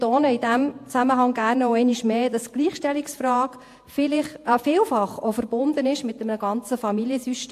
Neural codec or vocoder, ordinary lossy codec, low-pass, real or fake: vocoder, 48 kHz, 128 mel bands, Vocos; AAC, 64 kbps; 14.4 kHz; fake